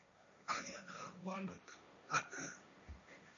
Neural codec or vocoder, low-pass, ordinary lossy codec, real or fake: codec, 16 kHz, 1.1 kbps, Voila-Tokenizer; 7.2 kHz; AAC, 64 kbps; fake